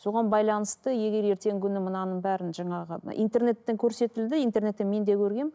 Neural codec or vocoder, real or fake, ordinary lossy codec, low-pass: none; real; none; none